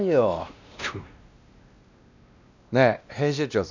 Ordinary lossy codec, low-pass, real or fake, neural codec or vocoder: none; 7.2 kHz; fake; codec, 16 kHz, 1 kbps, X-Codec, WavLM features, trained on Multilingual LibriSpeech